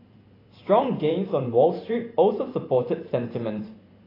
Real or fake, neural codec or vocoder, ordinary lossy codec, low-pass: fake; vocoder, 44.1 kHz, 128 mel bands every 512 samples, BigVGAN v2; AAC, 24 kbps; 5.4 kHz